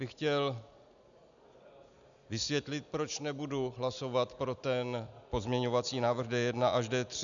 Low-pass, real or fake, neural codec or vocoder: 7.2 kHz; real; none